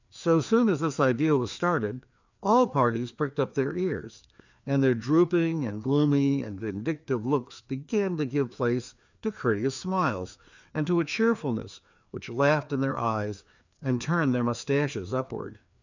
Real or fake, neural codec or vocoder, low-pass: fake; codec, 16 kHz, 2 kbps, FreqCodec, larger model; 7.2 kHz